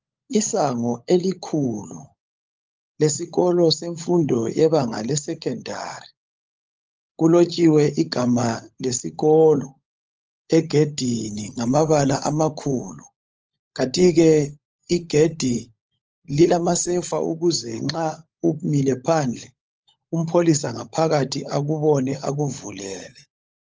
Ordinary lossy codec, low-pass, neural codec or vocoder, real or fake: Opus, 24 kbps; 7.2 kHz; codec, 16 kHz, 16 kbps, FunCodec, trained on LibriTTS, 50 frames a second; fake